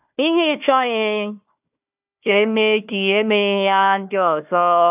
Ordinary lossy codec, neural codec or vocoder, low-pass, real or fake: AAC, 32 kbps; codec, 16 kHz, 1 kbps, FunCodec, trained on Chinese and English, 50 frames a second; 3.6 kHz; fake